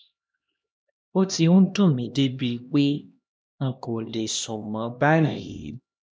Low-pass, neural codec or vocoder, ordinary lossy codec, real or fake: none; codec, 16 kHz, 1 kbps, X-Codec, HuBERT features, trained on LibriSpeech; none; fake